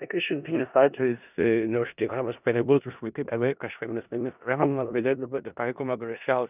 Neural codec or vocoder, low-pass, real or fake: codec, 16 kHz in and 24 kHz out, 0.4 kbps, LongCat-Audio-Codec, four codebook decoder; 3.6 kHz; fake